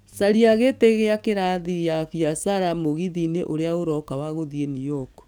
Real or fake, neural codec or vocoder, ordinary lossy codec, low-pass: fake; codec, 44.1 kHz, 7.8 kbps, DAC; none; none